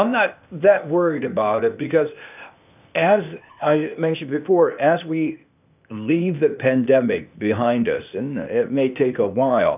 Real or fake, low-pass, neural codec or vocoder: fake; 3.6 kHz; codec, 16 kHz, 0.8 kbps, ZipCodec